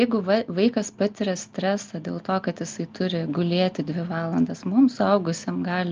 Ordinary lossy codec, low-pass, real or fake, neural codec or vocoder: Opus, 24 kbps; 7.2 kHz; real; none